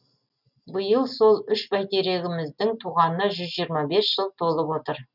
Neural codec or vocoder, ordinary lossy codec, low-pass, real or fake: none; none; 5.4 kHz; real